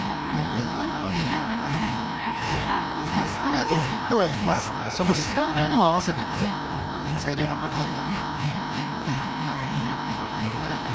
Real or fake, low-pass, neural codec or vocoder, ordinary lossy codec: fake; none; codec, 16 kHz, 1 kbps, FreqCodec, larger model; none